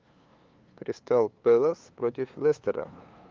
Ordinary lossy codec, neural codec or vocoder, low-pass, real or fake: Opus, 32 kbps; codec, 16 kHz, 2 kbps, FunCodec, trained on LibriTTS, 25 frames a second; 7.2 kHz; fake